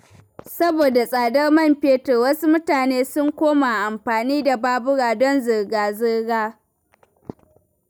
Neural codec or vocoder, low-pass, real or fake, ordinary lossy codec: none; none; real; none